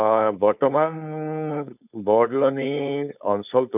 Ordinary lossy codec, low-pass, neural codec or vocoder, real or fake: none; 3.6 kHz; codec, 16 kHz, 4.8 kbps, FACodec; fake